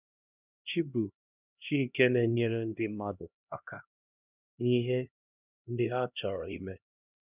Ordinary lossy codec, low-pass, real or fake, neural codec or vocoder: none; 3.6 kHz; fake; codec, 16 kHz, 1 kbps, X-Codec, HuBERT features, trained on LibriSpeech